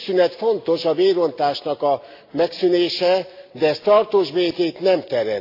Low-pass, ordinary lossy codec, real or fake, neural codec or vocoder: 5.4 kHz; AAC, 32 kbps; real; none